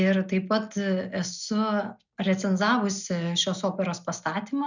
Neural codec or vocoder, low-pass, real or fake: none; 7.2 kHz; real